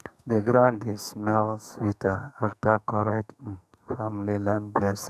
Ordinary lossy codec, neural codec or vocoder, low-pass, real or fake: none; codec, 44.1 kHz, 2.6 kbps, SNAC; 14.4 kHz; fake